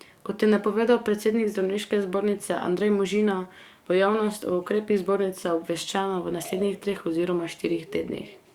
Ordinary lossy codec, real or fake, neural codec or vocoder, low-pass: Opus, 64 kbps; fake; codec, 44.1 kHz, 7.8 kbps, DAC; 19.8 kHz